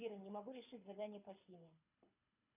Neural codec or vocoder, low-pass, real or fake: codec, 24 kHz, 6 kbps, HILCodec; 3.6 kHz; fake